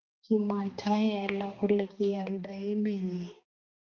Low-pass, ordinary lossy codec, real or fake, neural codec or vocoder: 7.2 kHz; Opus, 32 kbps; fake; codec, 16 kHz, 2 kbps, X-Codec, HuBERT features, trained on balanced general audio